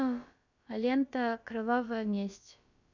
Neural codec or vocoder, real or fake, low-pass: codec, 16 kHz, about 1 kbps, DyCAST, with the encoder's durations; fake; 7.2 kHz